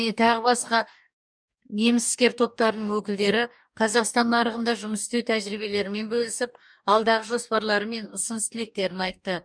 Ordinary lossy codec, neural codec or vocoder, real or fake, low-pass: none; codec, 44.1 kHz, 2.6 kbps, DAC; fake; 9.9 kHz